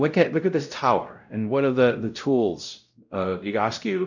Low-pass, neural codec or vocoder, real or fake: 7.2 kHz; codec, 16 kHz, 0.5 kbps, X-Codec, WavLM features, trained on Multilingual LibriSpeech; fake